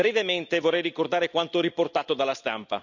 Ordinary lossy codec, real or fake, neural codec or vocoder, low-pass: none; real; none; 7.2 kHz